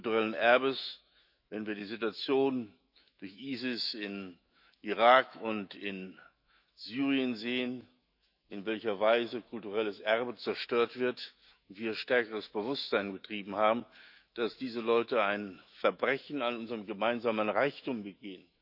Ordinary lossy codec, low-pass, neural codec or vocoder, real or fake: none; 5.4 kHz; autoencoder, 48 kHz, 128 numbers a frame, DAC-VAE, trained on Japanese speech; fake